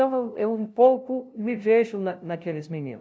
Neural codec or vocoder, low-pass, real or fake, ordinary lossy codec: codec, 16 kHz, 0.5 kbps, FunCodec, trained on LibriTTS, 25 frames a second; none; fake; none